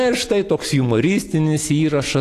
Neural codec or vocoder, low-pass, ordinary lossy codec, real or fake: none; 14.4 kHz; AAC, 48 kbps; real